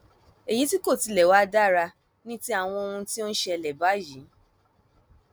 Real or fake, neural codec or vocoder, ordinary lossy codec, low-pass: real; none; none; none